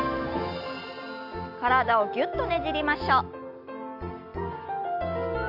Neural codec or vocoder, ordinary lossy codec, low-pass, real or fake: none; MP3, 48 kbps; 5.4 kHz; real